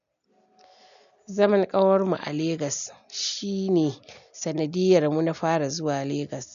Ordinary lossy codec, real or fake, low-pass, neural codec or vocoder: none; real; 7.2 kHz; none